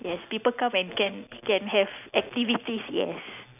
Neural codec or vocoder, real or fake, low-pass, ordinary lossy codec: none; real; 3.6 kHz; none